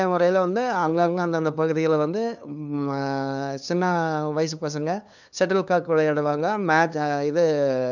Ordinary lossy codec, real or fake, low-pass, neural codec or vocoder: none; fake; 7.2 kHz; codec, 16 kHz, 2 kbps, FunCodec, trained on LibriTTS, 25 frames a second